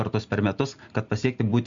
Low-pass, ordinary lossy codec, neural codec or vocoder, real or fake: 7.2 kHz; Opus, 64 kbps; none; real